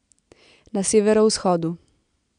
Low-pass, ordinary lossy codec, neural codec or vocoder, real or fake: 9.9 kHz; none; none; real